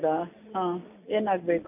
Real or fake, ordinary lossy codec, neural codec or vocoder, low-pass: real; none; none; 3.6 kHz